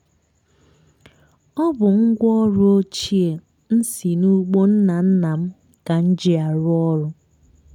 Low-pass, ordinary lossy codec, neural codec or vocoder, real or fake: 19.8 kHz; none; none; real